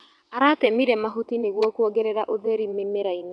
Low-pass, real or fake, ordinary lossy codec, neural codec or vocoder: none; fake; none; vocoder, 22.05 kHz, 80 mel bands, WaveNeXt